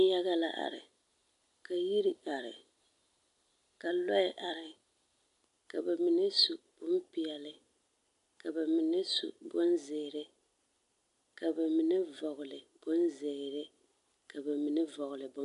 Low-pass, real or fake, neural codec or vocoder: 10.8 kHz; real; none